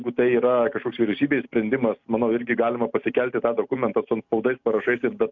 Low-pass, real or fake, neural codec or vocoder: 7.2 kHz; real; none